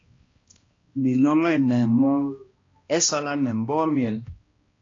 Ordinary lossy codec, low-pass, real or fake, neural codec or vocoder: AAC, 32 kbps; 7.2 kHz; fake; codec, 16 kHz, 1 kbps, X-Codec, HuBERT features, trained on balanced general audio